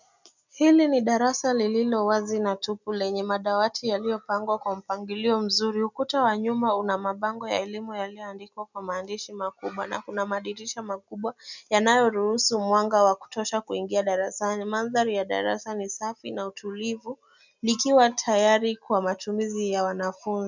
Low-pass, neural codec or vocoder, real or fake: 7.2 kHz; none; real